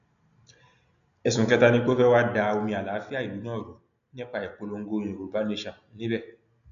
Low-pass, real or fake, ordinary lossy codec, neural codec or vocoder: 7.2 kHz; real; none; none